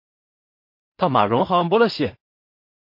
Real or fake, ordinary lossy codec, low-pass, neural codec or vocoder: fake; MP3, 32 kbps; 5.4 kHz; codec, 16 kHz in and 24 kHz out, 0.4 kbps, LongCat-Audio-Codec, two codebook decoder